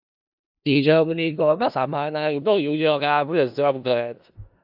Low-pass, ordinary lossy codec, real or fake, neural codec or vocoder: 5.4 kHz; AAC, 48 kbps; fake; codec, 16 kHz in and 24 kHz out, 0.4 kbps, LongCat-Audio-Codec, four codebook decoder